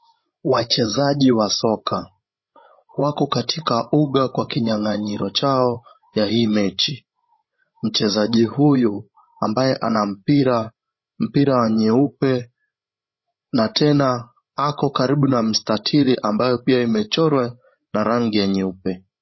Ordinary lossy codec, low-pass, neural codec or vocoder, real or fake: MP3, 24 kbps; 7.2 kHz; codec, 16 kHz, 16 kbps, FreqCodec, larger model; fake